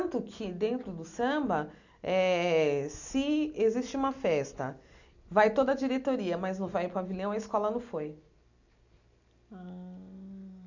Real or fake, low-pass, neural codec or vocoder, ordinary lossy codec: real; 7.2 kHz; none; none